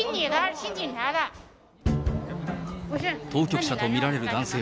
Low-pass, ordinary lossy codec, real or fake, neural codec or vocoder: none; none; real; none